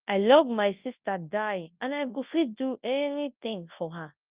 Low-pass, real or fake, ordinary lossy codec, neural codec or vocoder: 3.6 kHz; fake; Opus, 24 kbps; codec, 24 kHz, 0.9 kbps, WavTokenizer, large speech release